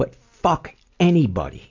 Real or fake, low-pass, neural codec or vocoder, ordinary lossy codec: real; 7.2 kHz; none; AAC, 48 kbps